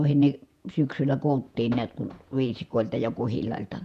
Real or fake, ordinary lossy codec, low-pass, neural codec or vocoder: fake; none; 14.4 kHz; vocoder, 48 kHz, 128 mel bands, Vocos